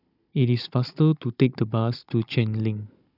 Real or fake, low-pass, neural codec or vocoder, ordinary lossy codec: fake; 5.4 kHz; codec, 16 kHz, 16 kbps, FunCodec, trained on Chinese and English, 50 frames a second; none